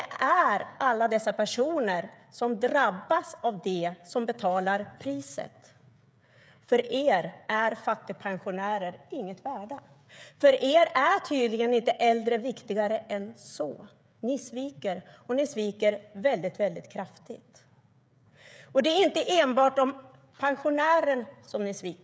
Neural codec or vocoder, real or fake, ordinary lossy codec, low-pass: codec, 16 kHz, 16 kbps, FreqCodec, smaller model; fake; none; none